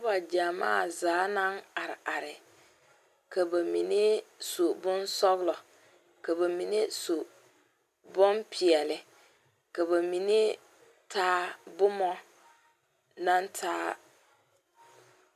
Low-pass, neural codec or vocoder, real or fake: 14.4 kHz; none; real